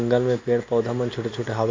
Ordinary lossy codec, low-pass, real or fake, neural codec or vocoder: MP3, 48 kbps; 7.2 kHz; real; none